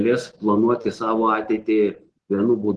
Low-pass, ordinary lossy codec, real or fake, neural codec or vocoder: 10.8 kHz; Opus, 16 kbps; real; none